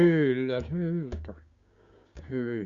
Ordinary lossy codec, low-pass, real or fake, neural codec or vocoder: Opus, 64 kbps; 7.2 kHz; fake; codec, 16 kHz, 6 kbps, DAC